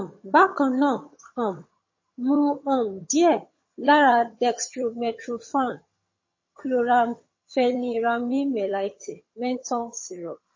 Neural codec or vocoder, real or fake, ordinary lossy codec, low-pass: vocoder, 22.05 kHz, 80 mel bands, HiFi-GAN; fake; MP3, 32 kbps; 7.2 kHz